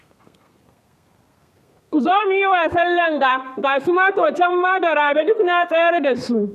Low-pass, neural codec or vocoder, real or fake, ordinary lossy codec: 14.4 kHz; codec, 32 kHz, 1.9 kbps, SNAC; fake; none